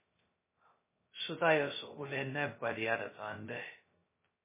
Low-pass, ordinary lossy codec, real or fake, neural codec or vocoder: 3.6 kHz; MP3, 16 kbps; fake; codec, 16 kHz, 0.2 kbps, FocalCodec